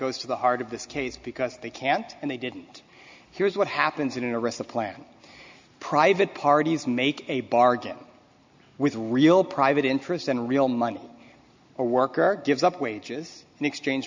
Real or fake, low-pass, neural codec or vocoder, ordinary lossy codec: real; 7.2 kHz; none; MP3, 64 kbps